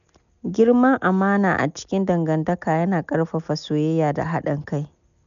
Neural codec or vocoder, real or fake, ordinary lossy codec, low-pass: none; real; none; 7.2 kHz